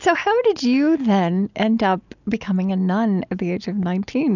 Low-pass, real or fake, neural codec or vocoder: 7.2 kHz; real; none